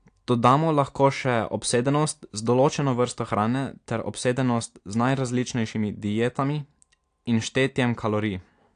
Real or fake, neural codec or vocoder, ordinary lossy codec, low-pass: real; none; AAC, 64 kbps; 9.9 kHz